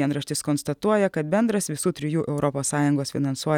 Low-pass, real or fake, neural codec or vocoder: 19.8 kHz; real; none